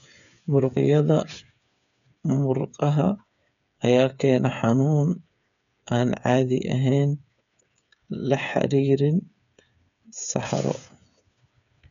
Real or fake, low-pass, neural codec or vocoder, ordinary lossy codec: fake; 7.2 kHz; codec, 16 kHz, 8 kbps, FreqCodec, smaller model; none